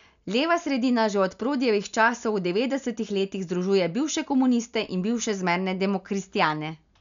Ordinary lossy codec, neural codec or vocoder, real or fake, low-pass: MP3, 96 kbps; none; real; 7.2 kHz